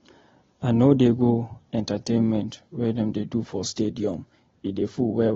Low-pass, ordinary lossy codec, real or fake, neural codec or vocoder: 7.2 kHz; AAC, 24 kbps; real; none